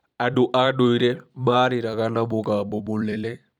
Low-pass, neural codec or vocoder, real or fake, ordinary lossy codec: 19.8 kHz; vocoder, 44.1 kHz, 128 mel bands every 256 samples, BigVGAN v2; fake; none